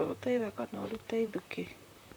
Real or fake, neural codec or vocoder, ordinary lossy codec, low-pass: fake; vocoder, 44.1 kHz, 128 mel bands, Pupu-Vocoder; none; none